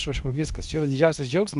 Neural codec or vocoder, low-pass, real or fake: codec, 24 kHz, 0.9 kbps, WavTokenizer, medium speech release version 2; 10.8 kHz; fake